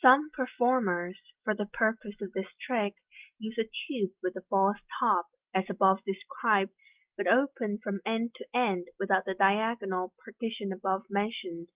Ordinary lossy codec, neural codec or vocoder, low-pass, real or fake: Opus, 32 kbps; none; 3.6 kHz; real